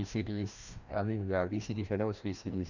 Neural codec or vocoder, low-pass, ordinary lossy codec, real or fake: codec, 16 kHz, 1 kbps, FreqCodec, larger model; 7.2 kHz; none; fake